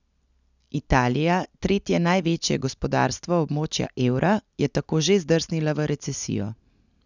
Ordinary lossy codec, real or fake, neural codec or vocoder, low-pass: none; real; none; 7.2 kHz